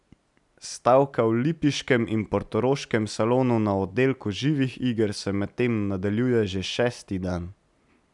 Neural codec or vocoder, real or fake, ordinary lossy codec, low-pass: none; real; none; 10.8 kHz